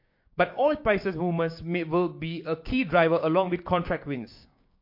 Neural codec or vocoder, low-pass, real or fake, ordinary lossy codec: codec, 16 kHz, 6 kbps, DAC; 5.4 kHz; fake; MP3, 32 kbps